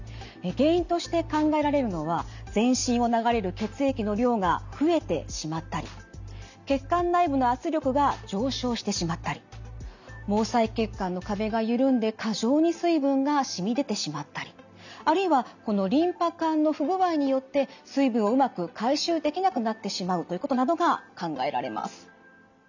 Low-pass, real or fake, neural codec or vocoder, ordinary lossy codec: 7.2 kHz; real; none; none